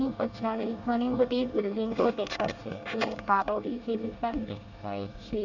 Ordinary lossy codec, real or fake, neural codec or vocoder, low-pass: none; fake; codec, 24 kHz, 1 kbps, SNAC; 7.2 kHz